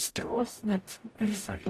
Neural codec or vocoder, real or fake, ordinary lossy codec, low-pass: codec, 44.1 kHz, 0.9 kbps, DAC; fake; AAC, 48 kbps; 14.4 kHz